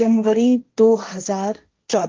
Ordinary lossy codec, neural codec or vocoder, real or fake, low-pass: Opus, 24 kbps; codec, 16 kHz, 1.1 kbps, Voila-Tokenizer; fake; 7.2 kHz